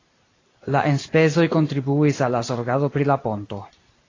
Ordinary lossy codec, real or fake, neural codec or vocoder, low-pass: AAC, 32 kbps; fake; vocoder, 44.1 kHz, 128 mel bands every 256 samples, BigVGAN v2; 7.2 kHz